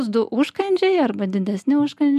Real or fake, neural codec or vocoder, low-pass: real; none; 14.4 kHz